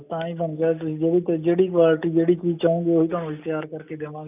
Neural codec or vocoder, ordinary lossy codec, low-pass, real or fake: none; none; 3.6 kHz; real